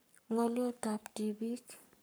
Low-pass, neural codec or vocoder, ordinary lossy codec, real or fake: none; codec, 44.1 kHz, 7.8 kbps, Pupu-Codec; none; fake